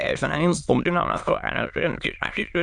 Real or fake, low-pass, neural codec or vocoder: fake; 9.9 kHz; autoencoder, 22.05 kHz, a latent of 192 numbers a frame, VITS, trained on many speakers